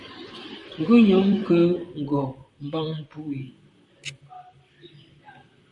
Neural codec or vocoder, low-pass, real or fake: vocoder, 44.1 kHz, 128 mel bands, Pupu-Vocoder; 10.8 kHz; fake